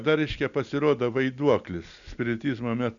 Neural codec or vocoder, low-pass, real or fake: none; 7.2 kHz; real